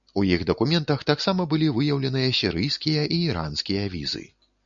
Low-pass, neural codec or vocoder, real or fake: 7.2 kHz; none; real